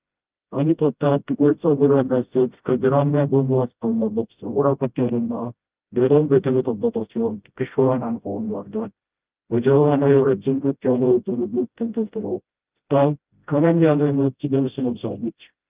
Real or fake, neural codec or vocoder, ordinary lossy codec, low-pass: fake; codec, 16 kHz, 0.5 kbps, FreqCodec, smaller model; Opus, 32 kbps; 3.6 kHz